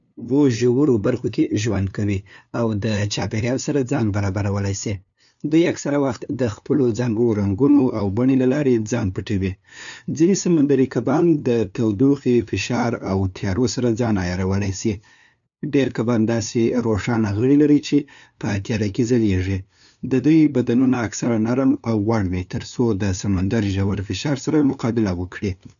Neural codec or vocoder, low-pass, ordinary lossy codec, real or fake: codec, 16 kHz, 2 kbps, FunCodec, trained on LibriTTS, 25 frames a second; 7.2 kHz; none; fake